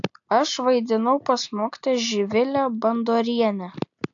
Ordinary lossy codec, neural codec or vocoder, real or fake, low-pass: AAC, 64 kbps; none; real; 7.2 kHz